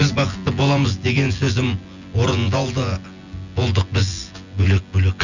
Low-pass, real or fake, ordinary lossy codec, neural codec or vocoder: 7.2 kHz; fake; none; vocoder, 24 kHz, 100 mel bands, Vocos